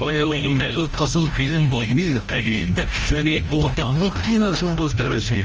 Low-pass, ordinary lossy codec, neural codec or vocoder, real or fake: 7.2 kHz; Opus, 24 kbps; codec, 16 kHz, 0.5 kbps, FreqCodec, larger model; fake